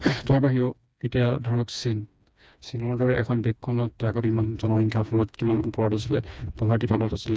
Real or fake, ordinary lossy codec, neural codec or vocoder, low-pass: fake; none; codec, 16 kHz, 2 kbps, FreqCodec, smaller model; none